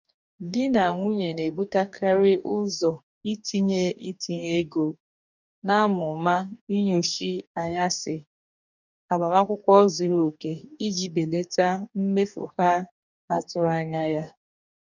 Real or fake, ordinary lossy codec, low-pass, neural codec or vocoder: fake; none; 7.2 kHz; codec, 44.1 kHz, 2.6 kbps, DAC